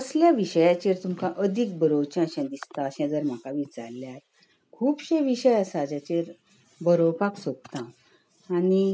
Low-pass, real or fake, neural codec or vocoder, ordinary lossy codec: none; real; none; none